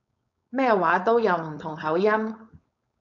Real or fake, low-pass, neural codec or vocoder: fake; 7.2 kHz; codec, 16 kHz, 4.8 kbps, FACodec